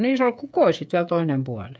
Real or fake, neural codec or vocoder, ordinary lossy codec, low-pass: fake; codec, 16 kHz, 8 kbps, FreqCodec, smaller model; none; none